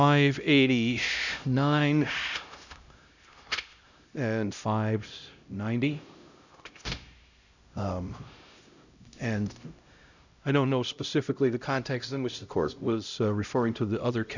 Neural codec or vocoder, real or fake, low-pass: codec, 16 kHz, 0.5 kbps, X-Codec, HuBERT features, trained on LibriSpeech; fake; 7.2 kHz